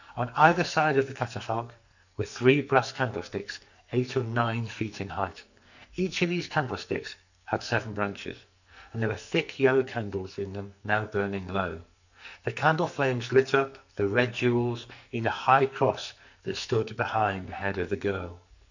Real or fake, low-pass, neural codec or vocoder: fake; 7.2 kHz; codec, 44.1 kHz, 2.6 kbps, SNAC